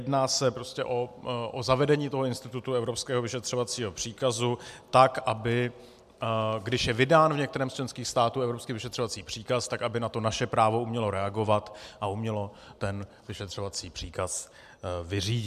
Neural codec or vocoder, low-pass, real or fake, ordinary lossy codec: none; 14.4 kHz; real; MP3, 96 kbps